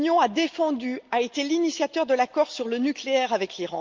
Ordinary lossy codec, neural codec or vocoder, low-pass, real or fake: Opus, 24 kbps; none; 7.2 kHz; real